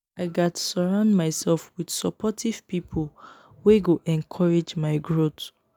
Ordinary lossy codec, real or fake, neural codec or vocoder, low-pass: none; real; none; none